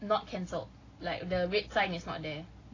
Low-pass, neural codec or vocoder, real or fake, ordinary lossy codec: 7.2 kHz; none; real; AAC, 32 kbps